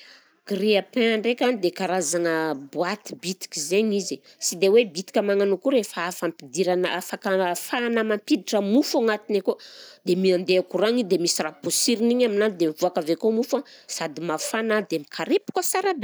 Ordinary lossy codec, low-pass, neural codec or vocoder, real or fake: none; none; none; real